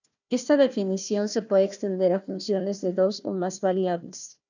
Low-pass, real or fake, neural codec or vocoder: 7.2 kHz; fake; codec, 16 kHz, 1 kbps, FunCodec, trained on Chinese and English, 50 frames a second